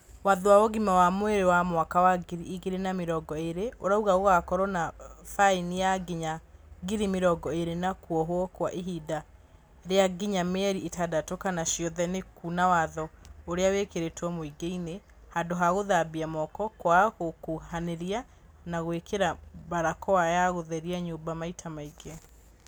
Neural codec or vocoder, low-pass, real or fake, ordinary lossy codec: none; none; real; none